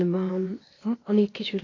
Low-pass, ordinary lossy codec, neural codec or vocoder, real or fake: 7.2 kHz; AAC, 32 kbps; codec, 16 kHz, 0.8 kbps, ZipCodec; fake